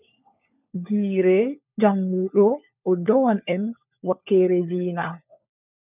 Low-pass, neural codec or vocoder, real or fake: 3.6 kHz; codec, 16 kHz, 4 kbps, FunCodec, trained on LibriTTS, 50 frames a second; fake